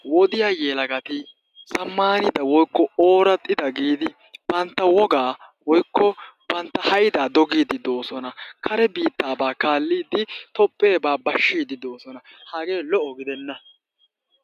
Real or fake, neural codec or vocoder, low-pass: real; none; 14.4 kHz